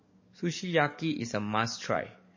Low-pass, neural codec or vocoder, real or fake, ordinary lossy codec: 7.2 kHz; codec, 44.1 kHz, 7.8 kbps, DAC; fake; MP3, 32 kbps